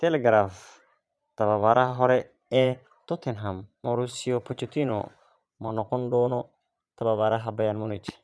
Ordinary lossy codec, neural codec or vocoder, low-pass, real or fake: none; vocoder, 22.05 kHz, 80 mel bands, Vocos; none; fake